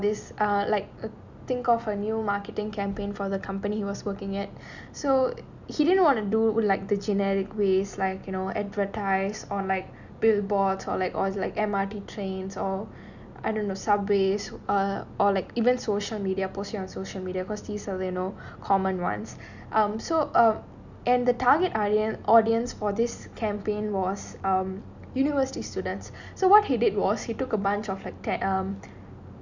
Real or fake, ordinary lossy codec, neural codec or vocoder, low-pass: real; none; none; 7.2 kHz